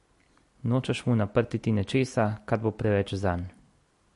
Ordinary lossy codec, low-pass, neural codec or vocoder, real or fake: MP3, 48 kbps; 14.4 kHz; none; real